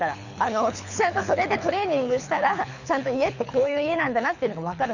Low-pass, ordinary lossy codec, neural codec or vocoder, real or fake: 7.2 kHz; none; codec, 24 kHz, 6 kbps, HILCodec; fake